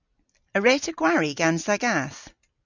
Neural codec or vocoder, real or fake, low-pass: none; real; 7.2 kHz